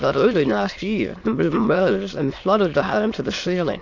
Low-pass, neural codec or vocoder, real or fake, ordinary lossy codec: 7.2 kHz; autoencoder, 22.05 kHz, a latent of 192 numbers a frame, VITS, trained on many speakers; fake; AAC, 48 kbps